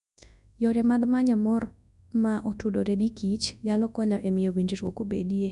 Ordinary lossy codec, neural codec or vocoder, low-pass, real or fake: none; codec, 24 kHz, 0.9 kbps, WavTokenizer, large speech release; 10.8 kHz; fake